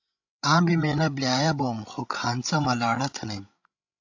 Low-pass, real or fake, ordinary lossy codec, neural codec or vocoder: 7.2 kHz; fake; AAC, 48 kbps; codec, 16 kHz, 16 kbps, FreqCodec, larger model